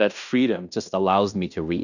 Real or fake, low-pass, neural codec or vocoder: fake; 7.2 kHz; codec, 16 kHz in and 24 kHz out, 0.9 kbps, LongCat-Audio-Codec, fine tuned four codebook decoder